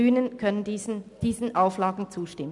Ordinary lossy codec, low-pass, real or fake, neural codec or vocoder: none; 10.8 kHz; real; none